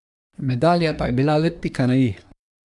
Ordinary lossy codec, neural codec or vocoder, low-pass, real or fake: none; codec, 24 kHz, 1 kbps, SNAC; 10.8 kHz; fake